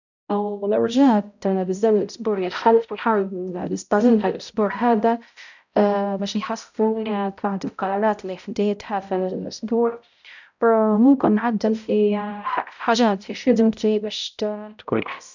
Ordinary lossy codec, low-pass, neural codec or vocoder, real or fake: none; 7.2 kHz; codec, 16 kHz, 0.5 kbps, X-Codec, HuBERT features, trained on balanced general audio; fake